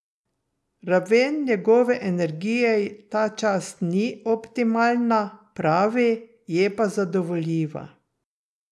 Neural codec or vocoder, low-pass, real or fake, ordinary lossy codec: none; none; real; none